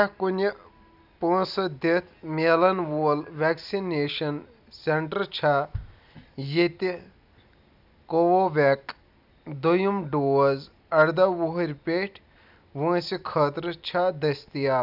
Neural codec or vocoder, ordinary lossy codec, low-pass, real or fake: none; none; 5.4 kHz; real